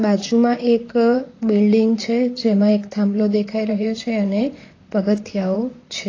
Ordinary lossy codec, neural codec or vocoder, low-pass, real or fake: AAC, 32 kbps; vocoder, 44.1 kHz, 128 mel bands, Pupu-Vocoder; 7.2 kHz; fake